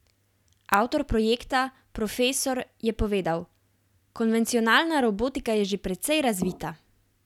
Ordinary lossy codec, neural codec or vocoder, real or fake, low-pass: none; none; real; 19.8 kHz